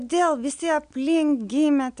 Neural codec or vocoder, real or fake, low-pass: none; real; 9.9 kHz